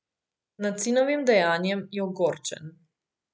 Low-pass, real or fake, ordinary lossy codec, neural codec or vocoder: none; real; none; none